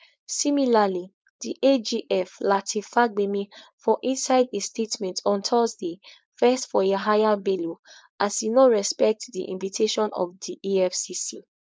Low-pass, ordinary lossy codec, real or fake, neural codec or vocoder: none; none; fake; codec, 16 kHz, 4.8 kbps, FACodec